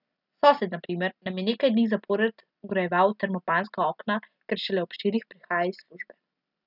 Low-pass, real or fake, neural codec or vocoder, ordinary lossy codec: 5.4 kHz; real; none; none